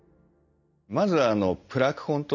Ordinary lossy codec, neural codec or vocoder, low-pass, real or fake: none; none; 7.2 kHz; real